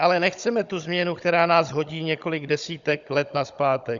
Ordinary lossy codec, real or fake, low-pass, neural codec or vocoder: Opus, 64 kbps; fake; 7.2 kHz; codec, 16 kHz, 16 kbps, FunCodec, trained on Chinese and English, 50 frames a second